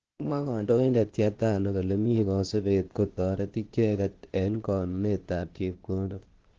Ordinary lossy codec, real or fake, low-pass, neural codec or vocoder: Opus, 16 kbps; fake; 7.2 kHz; codec, 16 kHz, 0.8 kbps, ZipCodec